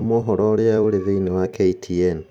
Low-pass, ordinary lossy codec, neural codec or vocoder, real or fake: 19.8 kHz; none; vocoder, 44.1 kHz, 128 mel bands every 256 samples, BigVGAN v2; fake